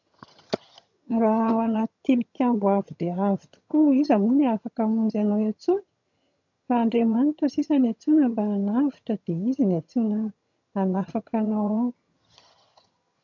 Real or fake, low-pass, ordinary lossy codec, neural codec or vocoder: fake; 7.2 kHz; none; vocoder, 22.05 kHz, 80 mel bands, HiFi-GAN